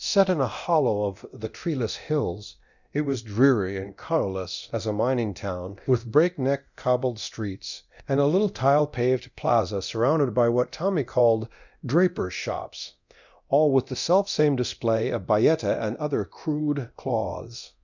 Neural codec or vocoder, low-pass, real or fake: codec, 24 kHz, 0.9 kbps, DualCodec; 7.2 kHz; fake